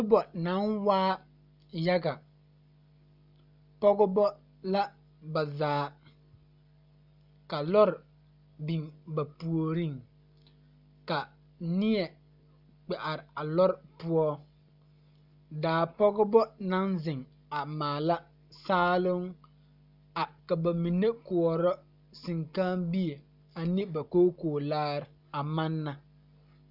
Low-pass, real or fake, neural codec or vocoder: 5.4 kHz; real; none